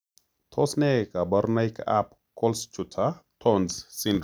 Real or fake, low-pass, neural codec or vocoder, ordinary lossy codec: real; none; none; none